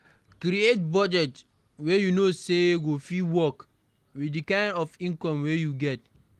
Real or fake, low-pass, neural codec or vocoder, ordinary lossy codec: real; 14.4 kHz; none; Opus, 24 kbps